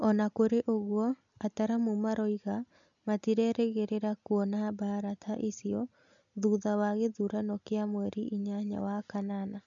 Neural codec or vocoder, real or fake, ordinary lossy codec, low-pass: none; real; none; 7.2 kHz